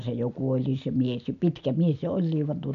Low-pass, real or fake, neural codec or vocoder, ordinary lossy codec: 7.2 kHz; real; none; AAC, 96 kbps